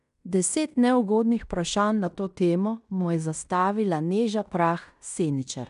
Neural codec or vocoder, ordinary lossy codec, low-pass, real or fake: codec, 16 kHz in and 24 kHz out, 0.9 kbps, LongCat-Audio-Codec, fine tuned four codebook decoder; none; 10.8 kHz; fake